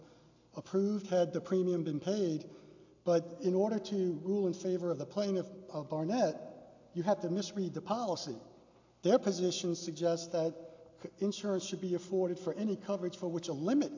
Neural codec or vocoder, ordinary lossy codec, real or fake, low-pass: none; AAC, 48 kbps; real; 7.2 kHz